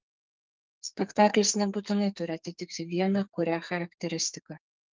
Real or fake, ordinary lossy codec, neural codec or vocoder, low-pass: fake; Opus, 32 kbps; codec, 44.1 kHz, 2.6 kbps, SNAC; 7.2 kHz